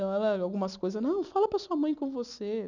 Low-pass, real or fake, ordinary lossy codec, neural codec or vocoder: 7.2 kHz; real; none; none